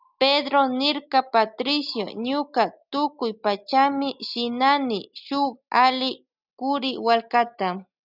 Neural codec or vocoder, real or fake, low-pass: none; real; 5.4 kHz